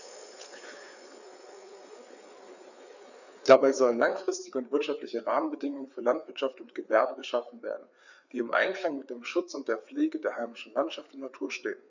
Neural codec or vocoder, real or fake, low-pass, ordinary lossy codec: codec, 16 kHz, 4 kbps, FreqCodec, larger model; fake; 7.2 kHz; none